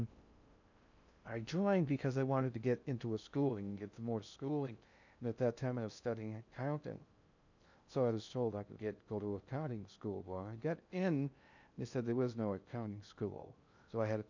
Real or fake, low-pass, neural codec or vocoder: fake; 7.2 kHz; codec, 16 kHz in and 24 kHz out, 0.6 kbps, FocalCodec, streaming, 2048 codes